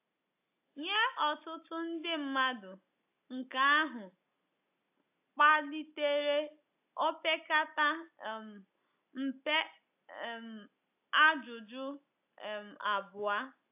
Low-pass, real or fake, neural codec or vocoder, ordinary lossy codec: 3.6 kHz; real; none; AAC, 32 kbps